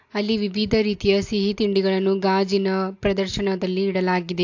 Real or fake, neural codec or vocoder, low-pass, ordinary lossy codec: real; none; 7.2 kHz; AAC, 48 kbps